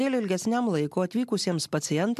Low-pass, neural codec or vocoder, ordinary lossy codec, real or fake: 14.4 kHz; none; MP3, 96 kbps; real